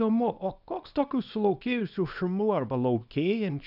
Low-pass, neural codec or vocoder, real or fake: 5.4 kHz; codec, 24 kHz, 0.9 kbps, WavTokenizer, small release; fake